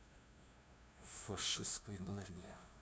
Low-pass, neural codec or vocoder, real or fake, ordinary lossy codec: none; codec, 16 kHz, 0.5 kbps, FunCodec, trained on LibriTTS, 25 frames a second; fake; none